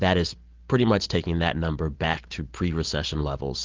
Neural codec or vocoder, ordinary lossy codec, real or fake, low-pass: none; Opus, 16 kbps; real; 7.2 kHz